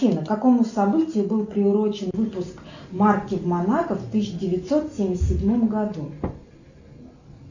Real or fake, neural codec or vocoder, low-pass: real; none; 7.2 kHz